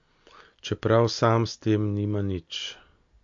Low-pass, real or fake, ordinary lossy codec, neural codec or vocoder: 7.2 kHz; real; MP3, 48 kbps; none